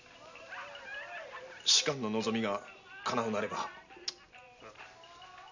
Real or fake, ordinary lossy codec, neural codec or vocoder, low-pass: real; none; none; 7.2 kHz